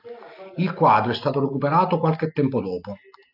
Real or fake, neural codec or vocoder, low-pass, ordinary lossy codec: real; none; 5.4 kHz; AAC, 48 kbps